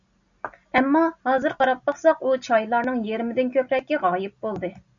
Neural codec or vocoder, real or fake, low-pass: none; real; 7.2 kHz